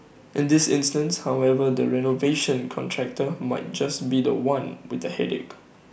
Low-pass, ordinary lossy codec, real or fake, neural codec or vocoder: none; none; real; none